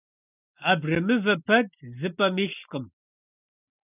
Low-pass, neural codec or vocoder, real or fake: 3.6 kHz; none; real